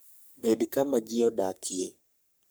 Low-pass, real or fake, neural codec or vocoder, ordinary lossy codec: none; fake; codec, 44.1 kHz, 3.4 kbps, Pupu-Codec; none